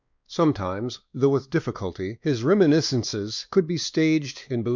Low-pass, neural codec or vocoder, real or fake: 7.2 kHz; codec, 16 kHz, 2 kbps, X-Codec, WavLM features, trained on Multilingual LibriSpeech; fake